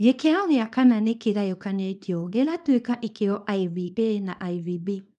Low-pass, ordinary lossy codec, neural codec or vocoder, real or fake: 10.8 kHz; none; codec, 24 kHz, 0.9 kbps, WavTokenizer, small release; fake